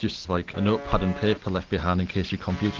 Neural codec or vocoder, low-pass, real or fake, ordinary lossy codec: none; 7.2 kHz; real; Opus, 16 kbps